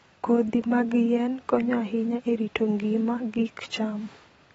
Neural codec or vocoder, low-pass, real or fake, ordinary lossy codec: vocoder, 44.1 kHz, 128 mel bands every 256 samples, BigVGAN v2; 19.8 kHz; fake; AAC, 24 kbps